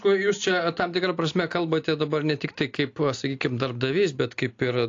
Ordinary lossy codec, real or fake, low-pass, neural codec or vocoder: AAC, 48 kbps; real; 7.2 kHz; none